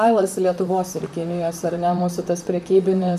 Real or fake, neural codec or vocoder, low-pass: fake; vocoder, 44.1 kHz, 128 mel bands, Pupu-Vocoder; 14.4 kHz